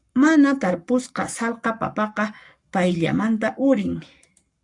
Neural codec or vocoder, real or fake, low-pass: codec, 44.1 kHz, 7.8 kbps, Pupu-Codec; fake; 10.8 kHz